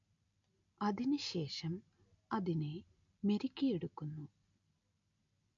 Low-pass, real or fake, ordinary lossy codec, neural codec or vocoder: 7.2 kHz; real; MP3, 48 kbps; none